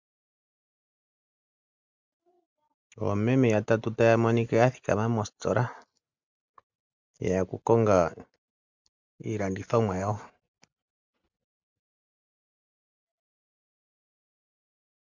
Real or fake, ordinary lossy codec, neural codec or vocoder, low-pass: real; MP3, 64 kbps; none; 7.2 kHz